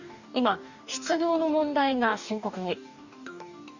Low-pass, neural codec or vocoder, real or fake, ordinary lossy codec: 7.2 kHz; codec, 44.1 kHz, 2.6 kbps, DAC; fake; none